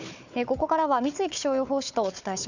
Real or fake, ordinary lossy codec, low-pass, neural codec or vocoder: fake; none; 7.2 kHz; codec, 16 kHz, 4 kbps, FunCodec, trained on Chinese and English, 50 frames a second